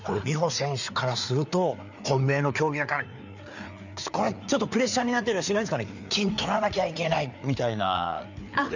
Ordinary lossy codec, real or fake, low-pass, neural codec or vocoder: none; fake; 7.2 kHz; codec, 16 kHz, 4 kbps, FreqCodec, larger model